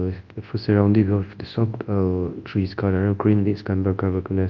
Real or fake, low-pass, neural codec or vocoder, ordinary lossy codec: fake; 7.2 kHz; codec, 24 kHz, 0.9 kbps, WavTokenizer, large speech release; Opus, 24 kbps